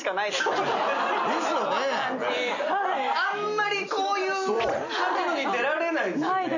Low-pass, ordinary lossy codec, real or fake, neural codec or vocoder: 7.2 kHz; none; real; none